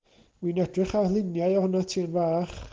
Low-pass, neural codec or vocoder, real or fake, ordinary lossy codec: 7.2 kHz; none; real; Opus, 16 kbps